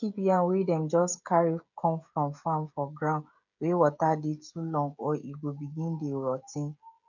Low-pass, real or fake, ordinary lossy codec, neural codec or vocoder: 7.2 kHz; fake; none; codec, 16 kHz, 16 kbps, FreqCodec, smaller model